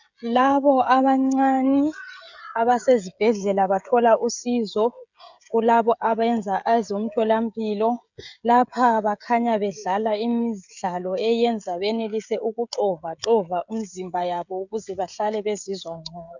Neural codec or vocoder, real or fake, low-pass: codec, 16 kHz, 16 kbps, FreqCodec, smaller model; fake; 7.2 kHz